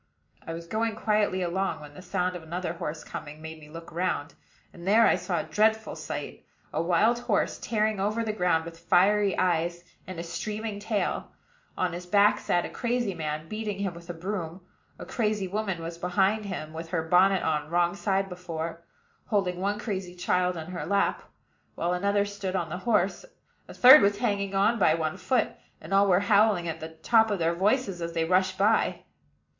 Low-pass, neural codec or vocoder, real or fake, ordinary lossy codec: 7.2 kHz; none; real; MP3, 48 kbps